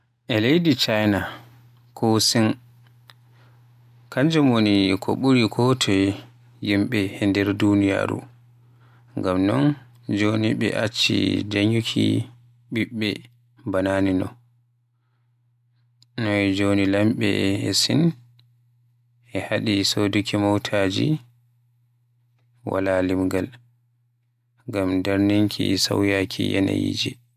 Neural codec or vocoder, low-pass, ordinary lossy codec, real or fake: none; 14.4 kHz; MP3, 96 kbps; real